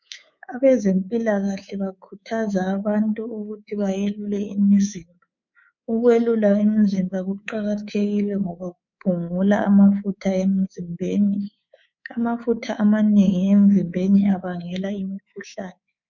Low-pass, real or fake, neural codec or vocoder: 7.2 kHz; fake; codec, 24 kHz, 3.1 kbps, DualCodec